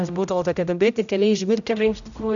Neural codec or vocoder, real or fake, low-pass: codec, 16 kHz, 0.5 kbps, X-Codec, HuBERT features, trained on general audio; fake; 7.2 kHz